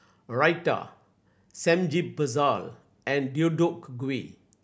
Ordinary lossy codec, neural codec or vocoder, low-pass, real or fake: none; none; none; real